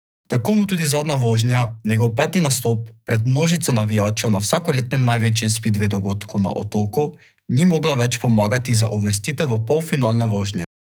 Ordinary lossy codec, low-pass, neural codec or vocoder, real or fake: none; none; codec, 44.1 kHz, 2.6 kbps, SNAC; fake